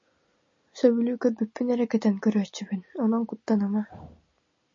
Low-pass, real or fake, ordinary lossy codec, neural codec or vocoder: 7.2 kHz; real; MP3, 32 kbps; none